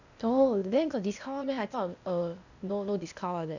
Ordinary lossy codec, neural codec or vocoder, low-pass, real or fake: none; codec, 16 kHz, 0.8 kbps, ZipCodec; 7.2 kHz; fake